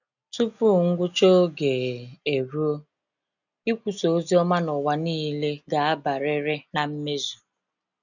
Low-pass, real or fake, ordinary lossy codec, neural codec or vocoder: 7.2 kHz; real; none; none